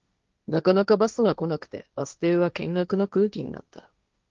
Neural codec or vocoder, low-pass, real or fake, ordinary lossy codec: codec, 16 kHz, 1.1 kbps, Voila-Tokenizer; 7.2 kHz; fake; Opus, 32 kbps